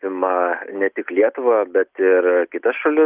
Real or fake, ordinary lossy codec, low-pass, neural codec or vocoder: real; Opus, 32 kbps; 3.6 kHz; none